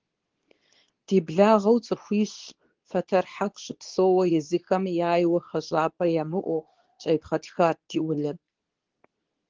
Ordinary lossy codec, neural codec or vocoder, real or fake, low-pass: Opus, 16 kbps; codec, 24 kHz, 0.9 kbps, WavTokenizer, medium speech release version 2; fake; 7.2 kHz